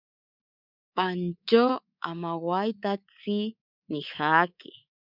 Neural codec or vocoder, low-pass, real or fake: codec, 24 kHz, 3.1 kbps, DualCodec; 5.4 kHz; fake